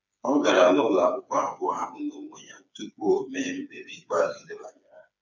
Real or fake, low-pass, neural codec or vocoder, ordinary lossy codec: fake; 7.2 kHz; codec, 16 kHz, 4 kbps, FreqCodec, smaller model; none